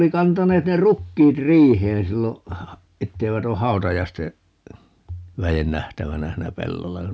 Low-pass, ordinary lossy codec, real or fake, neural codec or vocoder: none; none; real; none